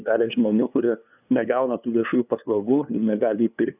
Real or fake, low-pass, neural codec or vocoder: fake; 3.6 kHz; codec, 16 kHz, 2 kbps, FunCodec, trained on LibriTTS, 25 frames a second